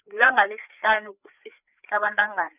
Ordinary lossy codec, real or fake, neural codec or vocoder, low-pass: none; fake; codec, 16 kHz, 4 kbps, FreqCodec, larger model; 3.6 kHz